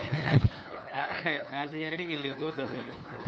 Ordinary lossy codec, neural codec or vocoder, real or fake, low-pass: none; codec, 16 kHz, 2 kbps, FunCodec, trained on LibriTTS, 25 frames a second; fake; none